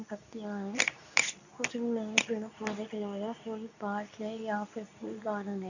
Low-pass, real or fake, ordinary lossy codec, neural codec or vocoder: 7.2 kHz; fake; none; codec, 24 kHz, 0.9 kbps, WavTokenizer, medium speech release version 2